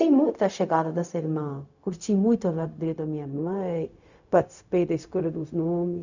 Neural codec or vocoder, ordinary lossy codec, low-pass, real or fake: codec, 16 kHz, 0.4 kbps, LongCat-Audio-Codec; none; 7.2 kHz; fake